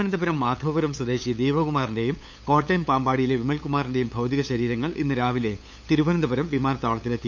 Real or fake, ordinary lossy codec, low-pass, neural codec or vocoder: fake; none; none; codec, 16 kHz, 8 kbps, FunCodec, trained on LibriTTS, 25 frames a second